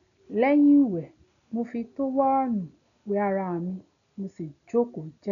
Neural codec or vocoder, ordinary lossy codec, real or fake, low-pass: none; none; real; 7.2 kHz